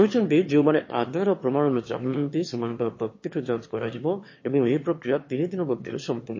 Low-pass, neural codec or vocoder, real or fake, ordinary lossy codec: 7.2 kHz; autoencoder, 22.05 kHz, a latent of 192 numbers a frame, VITS, trained on one speaker; fake; MP3, 32 kbps